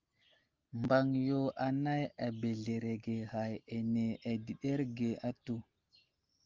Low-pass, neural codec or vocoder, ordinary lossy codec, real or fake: 7.2 kHz; none; Opus, 32 kbps; real